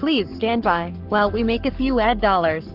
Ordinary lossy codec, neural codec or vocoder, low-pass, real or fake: Opus, 24 kbps; codec, 44.1 kHz, 7.8 kbps, Pupu-Codec; 5.4 kHz; fake